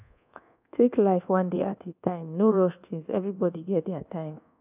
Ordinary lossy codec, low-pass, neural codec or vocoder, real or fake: none; 3.6 kHz; codec, 24 kHz, 1.2 kbps, DualCodec; fake